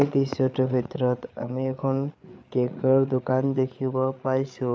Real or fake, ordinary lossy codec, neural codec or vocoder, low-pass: fake; none; codec, 16 kHz, 8 kbps, FreqCodec, larger model; none